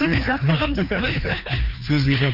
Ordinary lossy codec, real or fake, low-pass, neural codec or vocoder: Opus, 64 kbps; fake; 5.4 kHz; codec, 16 kHz, 2 kbps, FreqCodec, larger model